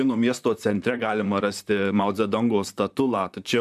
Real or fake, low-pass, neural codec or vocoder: fake; 14.4 kHz; vocoder, 44.1 kHz, 128 mel bands, Pupu-Vocoder